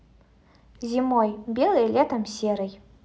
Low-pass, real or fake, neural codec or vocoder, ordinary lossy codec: none; real; none; none